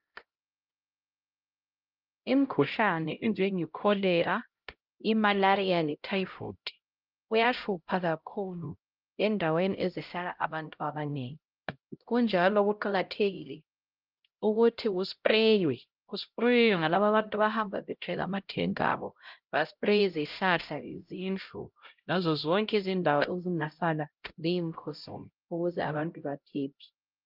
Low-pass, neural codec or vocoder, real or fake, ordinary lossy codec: 5.4 kHz; codec, 16 kHz, 0.5 kbps, X-Codec, HuBERT features, trained on LibriSpeech; fake; Opus, 24 kbps